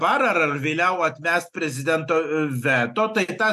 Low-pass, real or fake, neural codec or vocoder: 14.4 kHz; real; none